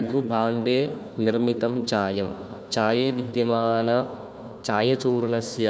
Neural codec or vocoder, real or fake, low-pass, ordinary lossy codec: codec, 16 kHz, 1 kbps, FunCodec, trained on Chinese and English, 50 frames a second; fake; none; none